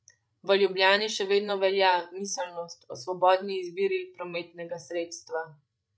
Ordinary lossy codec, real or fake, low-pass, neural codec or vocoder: none; fake; none; codec, 16 kHz, 16 kbps, FreqCodec, larger model